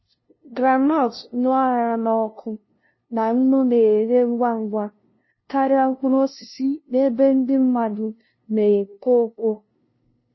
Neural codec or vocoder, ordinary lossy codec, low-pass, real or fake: codec, 16 kHz, 0.5 kbps, FunCodec, trained on LibriTTS, 25 frames a second; MP3, 24 kbps; 7.2 kHz; fake